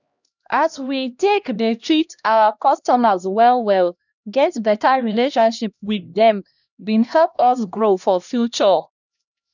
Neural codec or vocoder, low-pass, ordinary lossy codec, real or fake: codec, 16 kHz, 1 kbps, X-Codec, HuBERT features, trained on LibriSpeech; 7.2 kHz; none; fake